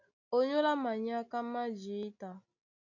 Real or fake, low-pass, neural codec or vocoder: real; 7.2 kHz; none